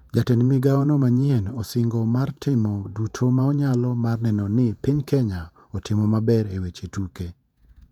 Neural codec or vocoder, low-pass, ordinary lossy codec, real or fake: vocoder, 48 kHz, 128 mel bands, Vocos; 19.8 kHz; none; fake